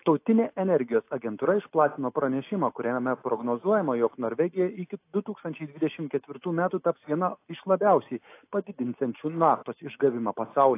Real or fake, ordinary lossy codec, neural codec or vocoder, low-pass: real; AAC, 24 kbps; none; 3.6 kHz